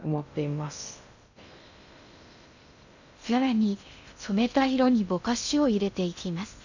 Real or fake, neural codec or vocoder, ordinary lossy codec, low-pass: fake; codec, 16 kHz in and 24 kHz out, 0.6 kbps, FocalCodec, streaming, 2048 codes; none; 7.2 kHz